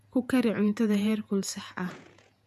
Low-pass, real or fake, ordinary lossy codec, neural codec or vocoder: 14.4 kHz; fake; none; vocoder, 48 kHz, 128 mel bands, Vocos